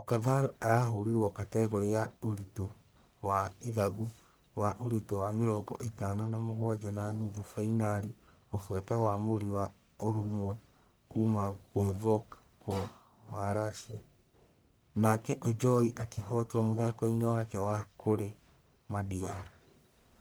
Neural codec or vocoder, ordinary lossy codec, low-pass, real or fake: codec, 44.1 kHz, 1.7 kbps, Pupu-Codec; none; none; fake